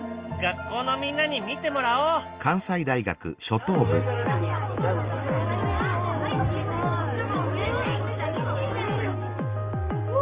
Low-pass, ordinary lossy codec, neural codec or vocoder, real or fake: 3.6 kHz; Opus, 32 kbps; none; real